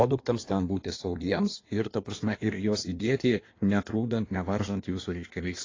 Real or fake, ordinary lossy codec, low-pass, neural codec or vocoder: fake; AAC, 32 kbps; 7.2 kHz; codec, 16 kHz in and 24 kHz out, 1.1 kbps, FireRedTTS-2 codec